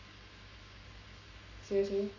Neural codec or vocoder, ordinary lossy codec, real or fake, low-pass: codec, 44.1 kHz, 7.8 kbps, Pupu-Codec; none; fake; 7.2 kHz